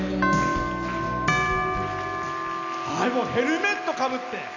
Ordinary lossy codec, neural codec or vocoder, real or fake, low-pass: none; none; real; 7.2 kHz